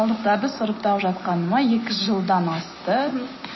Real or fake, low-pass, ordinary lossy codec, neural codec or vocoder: real; 7.2 kHz; MP3, 24 kbps; none